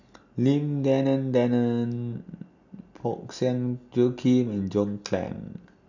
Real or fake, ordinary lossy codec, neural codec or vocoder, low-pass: real; none; none; 7.2 kHz